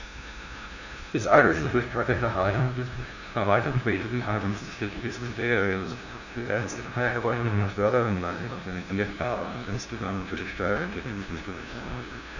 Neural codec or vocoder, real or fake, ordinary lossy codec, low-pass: codec, 16 kHz, 0.5 kbps, FunCodec, trained on LibriTTS, 25 frames a second; fake; none; 7.2 kHz